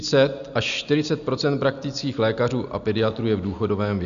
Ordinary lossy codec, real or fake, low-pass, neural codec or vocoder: AAC, 96 kbps; real; 7.2 kHz; none